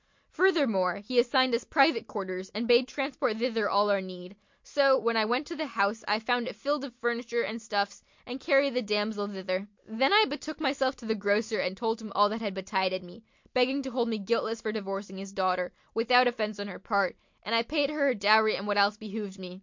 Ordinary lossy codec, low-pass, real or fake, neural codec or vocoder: MP3, 48 kbps; 7.2 kHz; real; none